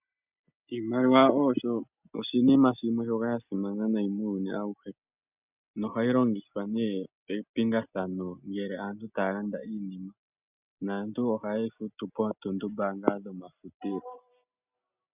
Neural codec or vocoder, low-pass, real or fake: none; 3.6 kHz; real